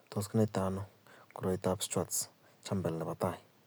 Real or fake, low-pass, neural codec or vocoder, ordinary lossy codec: real; none; none; none